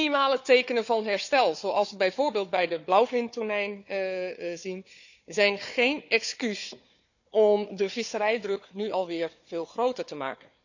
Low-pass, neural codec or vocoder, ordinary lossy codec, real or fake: 7.2 kHz; codec, 16 kHz, 4 kbps, FunCodec, trained on Chinese and English, 50 frames a second; none; fake